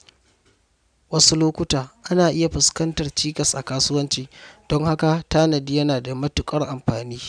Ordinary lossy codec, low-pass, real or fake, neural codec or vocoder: none; 9.9 kHz; real; none